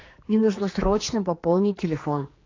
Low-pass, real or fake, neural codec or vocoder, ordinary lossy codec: 7.2 kHz; fake; codec, 16 kHz, 2 kbps, X-Codec, HuBERT features, trained on general audio; AAC, 32 kbps